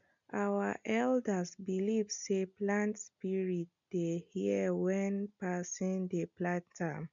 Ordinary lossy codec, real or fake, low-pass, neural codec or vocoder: none; real; 7.2 kHz; none